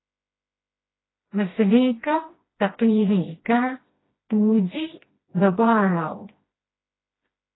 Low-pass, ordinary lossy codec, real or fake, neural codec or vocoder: 7.2 kHz; AAC, 16 kbps; fake; codec, 16 kHz, 1 kbps, FreqCodec, smaller model